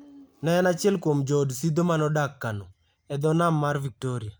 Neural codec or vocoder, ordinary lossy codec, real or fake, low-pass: none; none; real; none